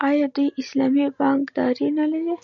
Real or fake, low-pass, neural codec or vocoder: real; 7.2 kHz; none